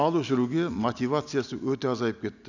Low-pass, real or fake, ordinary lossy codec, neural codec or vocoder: 7.2 kHz; real; none; none